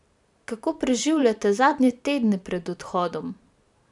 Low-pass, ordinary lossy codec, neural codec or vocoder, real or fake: 10.8 kHz; none; vocoder, 44.1 kHz, 128 mel bands every 512 samples, BigVGAN v2; fake